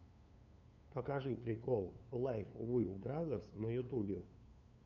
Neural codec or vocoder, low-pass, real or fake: codec, 16 kHz, 2 kbps, FunCodec, trained on LibriTTS, 25 frames a second; 7.2 kHz; fake